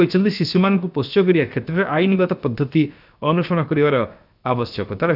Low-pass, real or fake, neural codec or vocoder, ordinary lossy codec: 5.4 kHz; fake; codec, 16 kHz, 0.7 kbps, FocalCodec; none